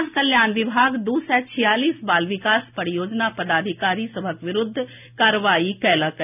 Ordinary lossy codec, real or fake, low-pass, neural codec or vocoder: none; real; 3.6 kHz; none